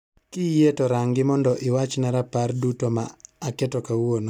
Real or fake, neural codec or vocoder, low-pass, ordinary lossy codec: real; none; 19.8 kHz; none